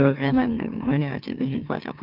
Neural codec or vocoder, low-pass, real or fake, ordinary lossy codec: autoencoder, 44.1 kHz, a latent of 192 numbers a frame, MeloTTS; 5.4 kHz; fake; Opus, 32 kbps